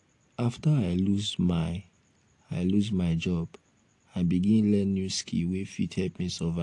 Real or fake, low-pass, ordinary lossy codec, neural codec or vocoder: real; 10.8 kHz; AAC, 48 kbps; none